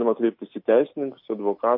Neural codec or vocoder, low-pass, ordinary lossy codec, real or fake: none; 5.4 kHz; MP3, 32 kbps; real